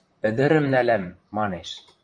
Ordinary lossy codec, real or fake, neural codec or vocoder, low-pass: MP3, 64 kbps; fake; vocoder, 44.1 kHz, 128 mel bands, Pupu-Vocoder; 9.9 kHz